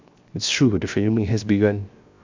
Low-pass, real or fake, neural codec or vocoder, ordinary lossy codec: 7.2 kHz; fake; codec, 16 kHz, 0.7 kbps, FocalCodec; MP3, 64 kbps